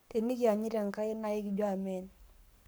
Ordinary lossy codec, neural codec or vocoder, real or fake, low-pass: none; codec, 44.1 kHz, 7.8 kbps, Pupu-Codec; fake; none